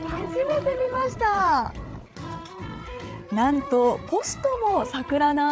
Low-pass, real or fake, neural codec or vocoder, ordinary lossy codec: none; fake; codec, 16 kHz, 8 kbps, FreqCodec, larger model; none